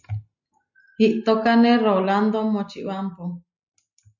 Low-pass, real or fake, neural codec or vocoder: 7.2 kHz; real; none